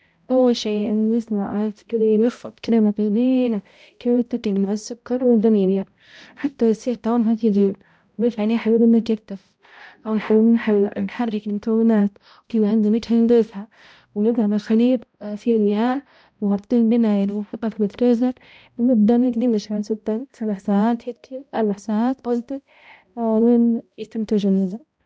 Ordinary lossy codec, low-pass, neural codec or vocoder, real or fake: none; none; codec, 16 kHz, 0.5 kbps, X-Codec, HuBERT features, trained on balanced general audio; fake